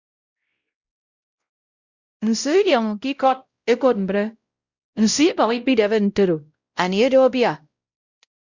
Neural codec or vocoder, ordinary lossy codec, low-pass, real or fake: codec, 16 kHz, 0.5 kbps, X-Codec, WavLM features, trained on Multilingual LibriSpeech; Opus, 64 kbps; 7.2 kHz; fake